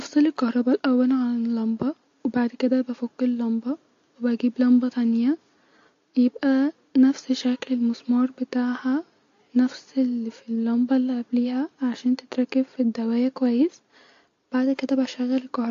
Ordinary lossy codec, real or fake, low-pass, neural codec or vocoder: AAC, 48 kbps; real; 7.2 kHz; none